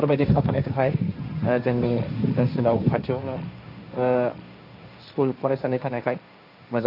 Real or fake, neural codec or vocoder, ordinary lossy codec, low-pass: fake; codec, 16 kHz, 1.1 kbps, Voila-Tokenizer; none; 5.4 kHz